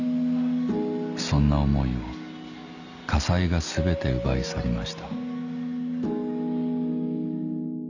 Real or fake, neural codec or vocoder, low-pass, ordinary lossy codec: real; none; 7.2 kHz; none